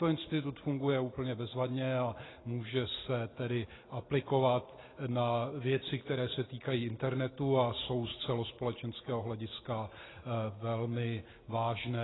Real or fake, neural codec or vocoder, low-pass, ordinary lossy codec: real; none; 7.2 kHz; AAC, 16 kbps